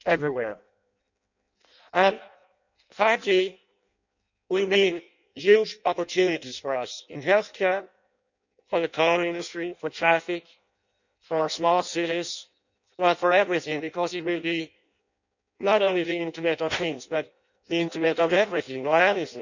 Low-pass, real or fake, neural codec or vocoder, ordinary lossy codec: 7.2 kHz; fake; codec, 16 kHz in and 24 kHz out, 0.6 kbps, FireRedTTS-2 codec; none